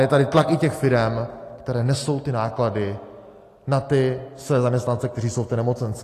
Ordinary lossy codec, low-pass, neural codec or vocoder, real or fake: AAC, 48 kbps; 14.4 kHz; none; real